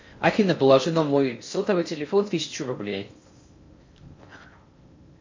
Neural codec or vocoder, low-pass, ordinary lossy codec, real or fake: codec, 16 kHz in and 24 kHz out, 0.6 kbps, FocalCodec, streaming, 4096 codes; 7.2 kHz; MP3, 48 kbps; fake